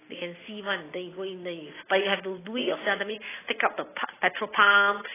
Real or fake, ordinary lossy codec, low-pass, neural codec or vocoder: real; AAC, 16 kbps; 3.6 kHz; none